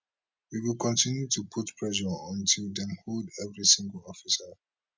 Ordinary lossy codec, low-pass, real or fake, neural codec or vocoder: none; none; real; none